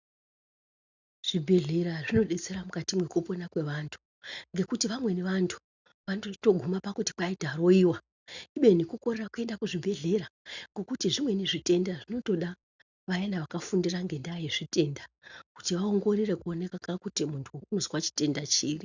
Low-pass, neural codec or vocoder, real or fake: 7.2 kHz; none; real